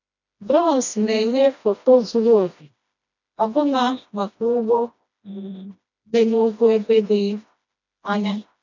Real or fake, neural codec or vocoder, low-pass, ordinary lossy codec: fake; codec, 16 kHz, 1 kbps, FreqCodec, smaller model; 7.2 kHz; none